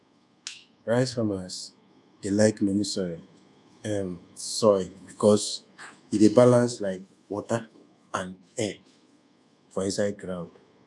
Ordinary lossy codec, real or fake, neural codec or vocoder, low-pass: none; fake; codec, 24 kHz, 1.2 kbps, DualCodec; none